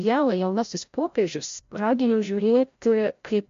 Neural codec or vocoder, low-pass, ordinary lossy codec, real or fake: codec, 16 kHz, 0.5 kbps, FreqCodec, larger model; 7.2 kHz; MP3, 48 kbps; fake